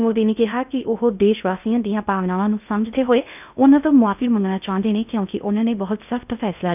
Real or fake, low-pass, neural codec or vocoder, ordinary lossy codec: fake; 3.6 kHz; codec, 16 kHz in and 24 kHz out, 0.6 kbps, FocalCodec, streaming, 2048 codes; none